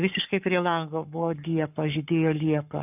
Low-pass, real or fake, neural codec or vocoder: 3.6 kHz; fake; codec, 44.1 kHz, 7.8 kbps, Pupu-Codec